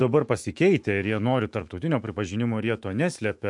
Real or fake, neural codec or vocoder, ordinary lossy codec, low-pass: fake; autoencoder, 48 kHz, 128 numbers a frame, DAC-VAE, trained on Japanese speech; MP3, 64 kbps; 10.8 kHz